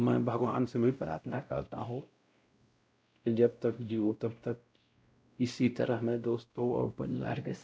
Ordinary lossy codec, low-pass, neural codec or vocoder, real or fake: none; none; codec, 16 kHz, 0.5 kbps, X-Codec, WavLM features, trained on Multilingual LibriSpeech; fake